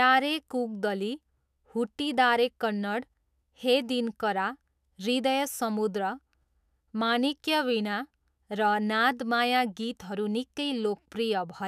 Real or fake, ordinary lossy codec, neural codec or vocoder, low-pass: fake; none; autoencoder, 48 kHz, 128 numbers a frame, DAC-VAE, trained on Japanese speech; 14.4 kHz